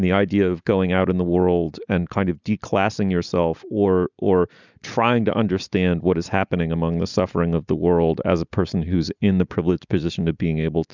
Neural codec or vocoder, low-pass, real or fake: none; 7.2 kHz; real